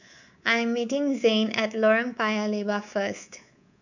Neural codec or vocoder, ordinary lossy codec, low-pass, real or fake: codec, 24 kHz, 3.1 kbps, DualCodec; none; 7.2 kHz; fake